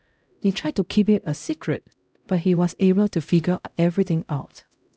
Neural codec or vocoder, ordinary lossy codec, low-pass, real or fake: codec, 16 kHz, 0.5 kbps, X-Codec, HuBERT features, trained on LibriSpeech; none; none; fake